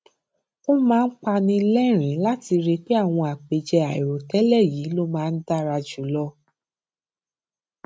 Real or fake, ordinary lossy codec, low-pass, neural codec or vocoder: real; none; none; none